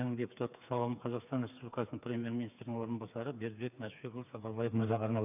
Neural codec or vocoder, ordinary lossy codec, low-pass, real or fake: codec, 16 kHz, 4 kbps, FreqCodec, smaller model; none; 3.6 kHz; fake